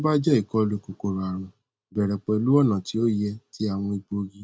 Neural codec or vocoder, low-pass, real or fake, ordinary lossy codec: none; none; real; none